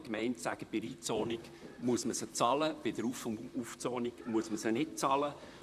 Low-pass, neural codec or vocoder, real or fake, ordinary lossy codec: 14.4 kHz; vocoder, 44.1 kHz, 128 mel bands, Pupu-Vocoder; fake; none